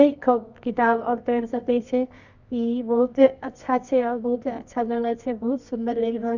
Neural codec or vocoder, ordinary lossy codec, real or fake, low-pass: codec, 24 kHz, 0.9 kbps, WavTokenizer, medium music audio release; none; fake; 7.2 kHz